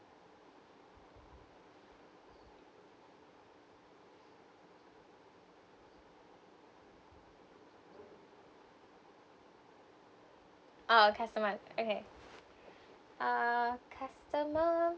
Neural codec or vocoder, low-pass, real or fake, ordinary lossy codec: none; none; real; none